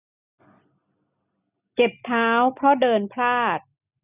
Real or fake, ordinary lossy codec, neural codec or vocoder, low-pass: real; none; none; 3.6 kHz